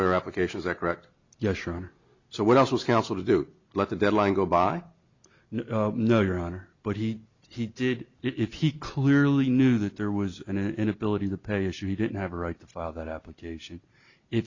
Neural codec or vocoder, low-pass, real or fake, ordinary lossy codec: none; 7.2 kHz; real; Opus, 64 kbps